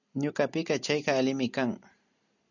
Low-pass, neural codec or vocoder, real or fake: 7.2 kHz; none; real